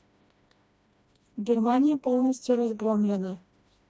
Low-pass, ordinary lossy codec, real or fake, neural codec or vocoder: none; none; fake; codec, 16 kHz, 1 kbps, FreqCodec, smaller model